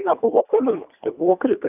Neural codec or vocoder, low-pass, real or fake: codec, 24 kHz, 1.5 kbps, HILCodec; 3.6 kHz; fake